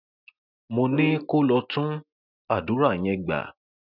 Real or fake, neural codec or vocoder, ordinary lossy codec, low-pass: real; none; none; 5.4 kHz